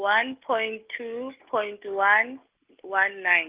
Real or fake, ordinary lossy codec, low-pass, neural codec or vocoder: real; Opus, 24 kbps; 3.6 kHz; none